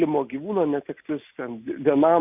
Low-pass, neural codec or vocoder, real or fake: 3.6 kHz; none; real